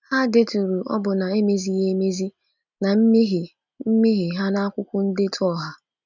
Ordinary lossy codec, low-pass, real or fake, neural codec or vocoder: none; 7.2 kHz; real; none